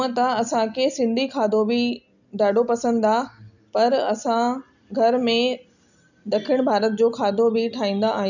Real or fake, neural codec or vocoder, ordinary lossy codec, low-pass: real; none; none; 7.2 kHz